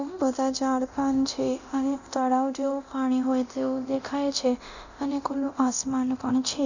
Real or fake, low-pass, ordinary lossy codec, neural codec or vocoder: fake; 7.2 kHz; none; codec, 24 kHz, 0.9 kbps, DualCodec